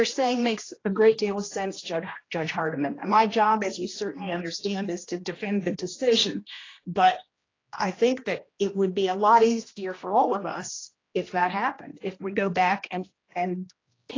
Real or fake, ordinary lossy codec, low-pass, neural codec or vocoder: fake; AAC, 32 kbps; 7.2 kHz; codec, 16 kHz, 1 kbps, X-Codec, HuBERT features, trained on general audio